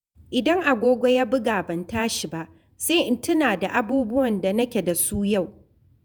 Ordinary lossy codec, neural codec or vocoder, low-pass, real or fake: none; vocoder, 48 kHz, 128 mel bands, Vocos; none; fake